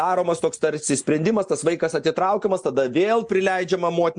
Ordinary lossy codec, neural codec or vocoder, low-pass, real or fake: MP3, 64 kbps; none; 9.9 kHz; real